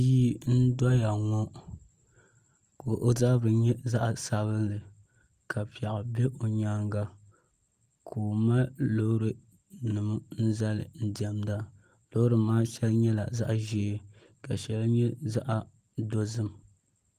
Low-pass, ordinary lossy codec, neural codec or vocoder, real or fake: 14.4 kHz; Opus, 24 kbps; none; real